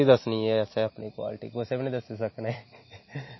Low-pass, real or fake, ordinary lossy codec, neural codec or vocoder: 7.2 kHz; real; MP3, 24 kbps; none